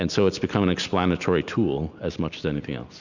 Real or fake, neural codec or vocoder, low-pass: real; none; 7.2 kHz